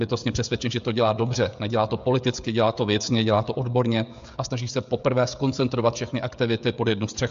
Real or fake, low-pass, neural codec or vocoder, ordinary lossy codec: fake; 7.2 kHz; codec, 16 kHz, 16 kbps, FreqCodec, smaller model; MP3, 64 kbps